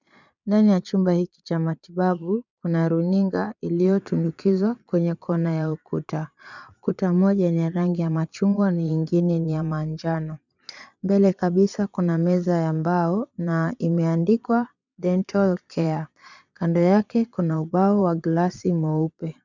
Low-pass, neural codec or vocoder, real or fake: 7.2 kHz; vocoder, 22.05 kHz, 80 mel bands, Vocos; fake